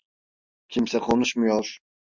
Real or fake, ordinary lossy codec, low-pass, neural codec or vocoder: real; MP3, 64 kbps; 7.2 kHz; none